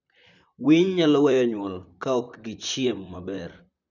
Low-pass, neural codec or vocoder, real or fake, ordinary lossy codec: 7.2 kHz; vocoder, 44.1 kHz, 128 mel bands, Pupu-Vocoder; fake; none